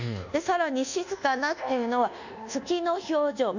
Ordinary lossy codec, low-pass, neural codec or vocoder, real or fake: none; 7.2 kHz; codec, 24 kHz, 1.2 kbps, DualCodec; fake